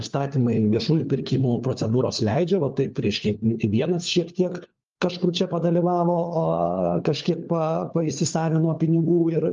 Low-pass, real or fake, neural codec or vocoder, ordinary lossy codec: 7.2 kHz; fake; codec, 16 kHz, 4 kbps, FunCodec, trained on LibriTTS, 50 frames a second; Opus, 24 kbps